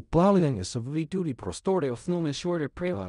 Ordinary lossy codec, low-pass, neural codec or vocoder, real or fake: none; 10.8 kHz; codec, 16 kHz in and 24 kHz out, 0.4 kbps, LongCat-Audio-Codec, fine tuned four codebook decoder; fake